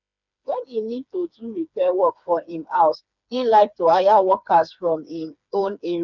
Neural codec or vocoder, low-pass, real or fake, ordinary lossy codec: codec, 16 kHz, 4 kbps, FreqCodec, smaller model; 7.2 kHz; fake; none